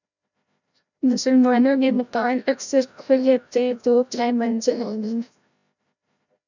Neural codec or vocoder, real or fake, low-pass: codec, 16 kHz, 0.5 kbps, FreqCodec, larger model; fake; 7.2 kHz